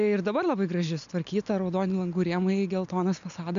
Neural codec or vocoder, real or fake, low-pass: none; real; 7.2 kHz